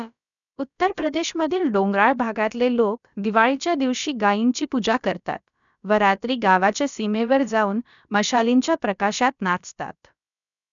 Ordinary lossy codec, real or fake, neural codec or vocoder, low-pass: none; fake; codec, 16 kHz, about 1 kbps, DyCAST, with the encoder's durations; 7.2 kHz